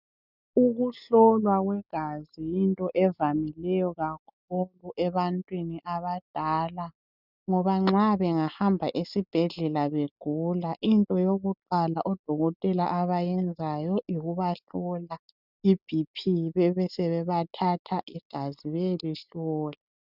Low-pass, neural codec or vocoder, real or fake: 5.4 kHz; none; real